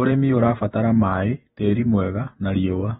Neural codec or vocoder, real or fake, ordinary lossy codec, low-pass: vocoder, 48 kHz, 128 mel bands, Vocos; fake; AAC, 16 kbps; 19.8 kHz